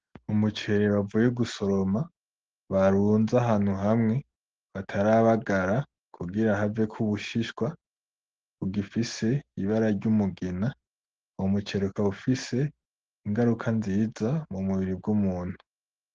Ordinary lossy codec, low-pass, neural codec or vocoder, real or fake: Opus, 16 kbps; 7.2 kHz; none; real